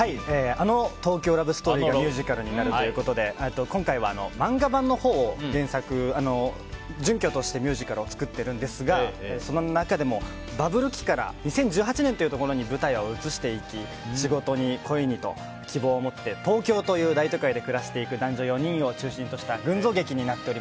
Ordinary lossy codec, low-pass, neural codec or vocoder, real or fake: none; none; none; real